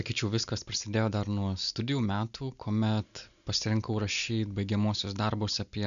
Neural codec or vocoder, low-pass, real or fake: none; 7.2 kHz; real